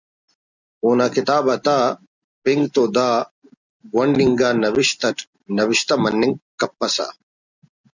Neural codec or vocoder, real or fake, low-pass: none; real; 7.2 kHz